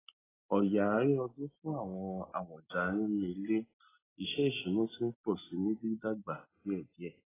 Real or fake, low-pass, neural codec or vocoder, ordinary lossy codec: real; 3.6 kHz; none; AAC, 16 kbps